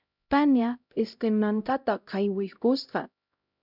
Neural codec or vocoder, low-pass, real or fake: codec, 16 kHz, 0.5 kbps, X-Codec, HuBERT features, trained on LibriSpeech; 5.4 kHz; fake